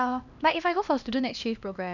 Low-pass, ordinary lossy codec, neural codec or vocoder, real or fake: 7.2 kHz; none; codec, 16 kHz, 1 kbps, X-Codec, HuBERT features, trained on LibriSpeech; fake